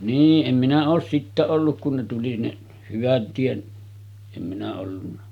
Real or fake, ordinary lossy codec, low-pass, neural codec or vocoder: real; none; 19.8 kHz; none